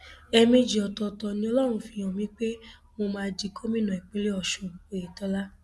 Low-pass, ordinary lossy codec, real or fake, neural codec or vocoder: none; none; real; none